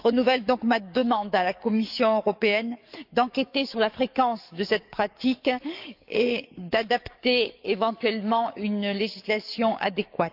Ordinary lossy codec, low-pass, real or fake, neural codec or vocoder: none; 5.4 kHz; fake; codec, 44.1 kHz, 7.8 kbps, DAC